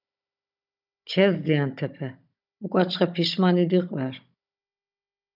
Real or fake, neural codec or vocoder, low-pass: fake; codec, 16 kHz, 16 kbps, FunCodec, trained on Chinese and English, 50 frames a second; 5.4 kHz